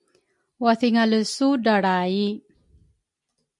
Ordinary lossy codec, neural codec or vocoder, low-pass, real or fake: MP3, 64 kbps; none; 10.8 kHz; real